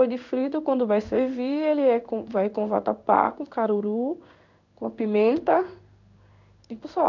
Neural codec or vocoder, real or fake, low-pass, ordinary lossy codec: codec, 16 kHz in and 24 kHz out, 1 kbps, XY-Tokenizer; fake; 7.2 kHz; none